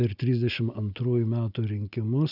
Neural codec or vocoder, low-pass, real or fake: none; 5.4 kHz; real